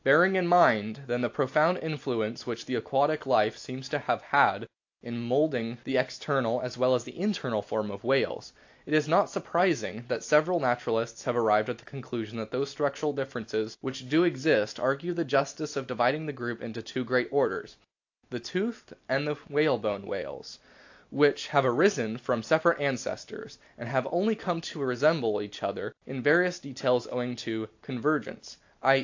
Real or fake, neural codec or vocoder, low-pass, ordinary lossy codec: real; none; 7.2 kHz; AAC, 48 kbps